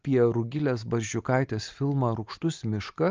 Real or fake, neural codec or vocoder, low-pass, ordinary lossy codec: real; none; 7.2 kHz; Opus, 24 kbps